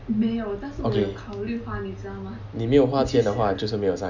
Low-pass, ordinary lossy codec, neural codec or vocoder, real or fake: 7.2 kHz; none; none; real